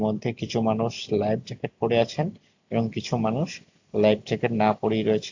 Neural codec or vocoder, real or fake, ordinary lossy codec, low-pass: none; real; AAC, 48 kbps; 7.2 kHz